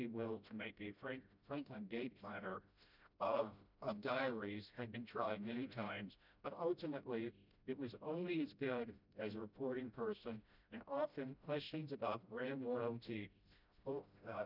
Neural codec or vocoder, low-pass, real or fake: codec, 16 kHz, 0.5 kbps, FreqCodec, smaller model; 5.4 kHz; fake